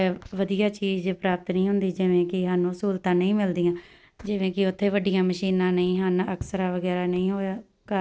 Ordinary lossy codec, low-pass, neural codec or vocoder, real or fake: none; none; none; real